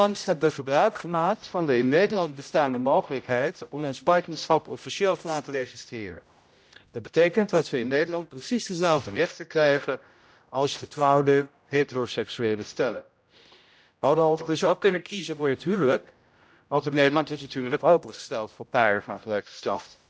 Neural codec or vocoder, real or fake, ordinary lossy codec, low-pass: codec, 16 kHz, 0.5 kbps, X-Codec, HuBERT features, trained on general audio; fake; none; none